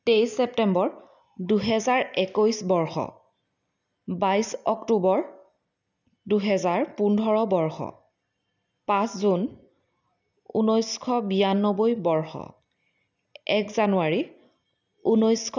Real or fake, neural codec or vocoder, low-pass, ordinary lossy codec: real; none; 7.2 kHz; none